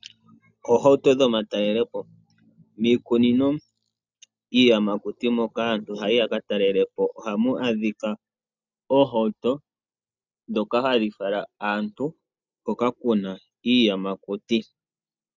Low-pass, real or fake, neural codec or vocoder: 7.2 kHz; real; none